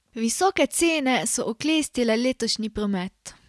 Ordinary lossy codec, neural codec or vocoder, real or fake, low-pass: none; none; real; none